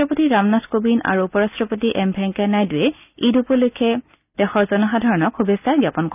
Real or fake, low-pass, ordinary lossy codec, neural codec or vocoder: real; 3.6 kHz; none; none